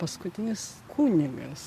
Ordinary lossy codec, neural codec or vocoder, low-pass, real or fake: MP3, 64 kbps; none; 14.4 kHz; real